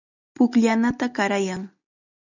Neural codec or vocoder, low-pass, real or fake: none; 7.2 kHz; real